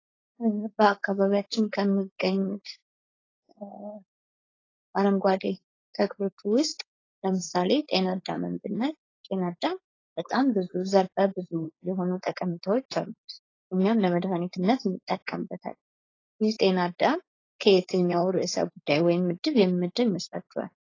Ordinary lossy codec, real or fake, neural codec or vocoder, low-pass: AAC, 32 kbps; fake; codec, 16 kHz, 4.8 kbps, FACodec; 7.2 kHz